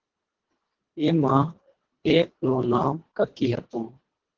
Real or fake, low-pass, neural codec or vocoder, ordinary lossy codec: fake; 7.2 kHz; codec, 24 kHz, 1.5 kbps, HILCodec; Opus, 16 kbps